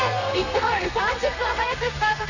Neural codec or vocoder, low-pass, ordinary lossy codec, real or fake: codec, 32 kHz, 1.9 kbps, SNAC; 7.2 kHz; none; fake